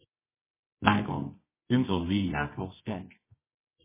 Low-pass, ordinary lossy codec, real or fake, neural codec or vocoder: 3.6 kHz; MP3, 16 kbps; fake; codec, 24 kHz, 0.9 kbps, WavTokenizer, medium music audio release